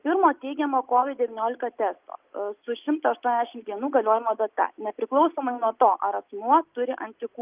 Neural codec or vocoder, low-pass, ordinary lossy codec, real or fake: none; 3.6 kHz; Opus, 24 kbps; real